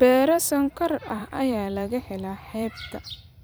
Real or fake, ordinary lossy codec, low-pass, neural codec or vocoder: fake; none; none; vocoder, 44.1 kHz, 128 mel bands every 256 samples, BigVGAN v2